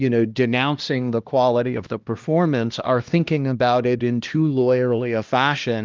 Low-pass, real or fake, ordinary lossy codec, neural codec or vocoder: 7.2 kHz; fake; Opus, 24 kbps; codec, 16 kHz, 1 kbps, X-Codec, HuBERT features, trained on LibriSpeech